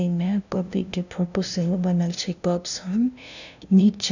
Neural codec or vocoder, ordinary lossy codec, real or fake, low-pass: codec, 16 kHz, 0.5 kbps, FunCodec, trained on LibriTTS, 25 frames a second; none; fake; 7.2 kHz